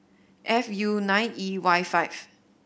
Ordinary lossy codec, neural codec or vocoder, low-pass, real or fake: none; none; none; real